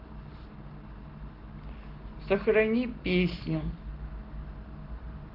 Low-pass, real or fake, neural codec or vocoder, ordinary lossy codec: 5.4 kHz; real; none; Opus, 16 kbps